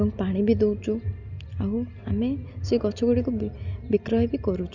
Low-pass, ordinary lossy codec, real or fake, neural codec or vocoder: 7.2 kHz; none; real; none